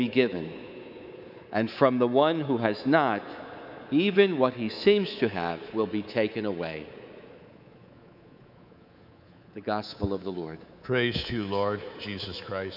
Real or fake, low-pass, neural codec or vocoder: fake; 5.4 kHz; codec, 24 kHz, 3.1 kbps, DualCodec